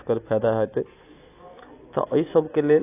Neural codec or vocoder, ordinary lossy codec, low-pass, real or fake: none; AAC, 24 kbps; 3.6 kHz; real